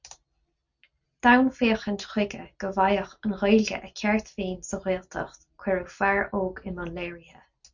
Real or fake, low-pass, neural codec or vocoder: real; 7.2 kHz; none